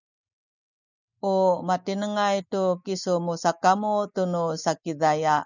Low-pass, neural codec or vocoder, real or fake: 7.2 kHz; none; real